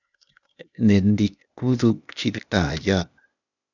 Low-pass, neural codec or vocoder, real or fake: 7.2 kHz; codec, 16 kHz, 0.8 kbps, ZipCodec; fake